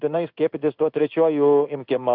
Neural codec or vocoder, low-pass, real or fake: codec, 16 kHz in and 24 kHz out, 1 kbps, XY-Tokenizer; 5.4 kHz; fake